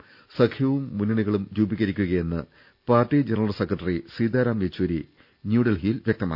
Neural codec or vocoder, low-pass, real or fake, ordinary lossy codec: none; 5.4 kHz; real; none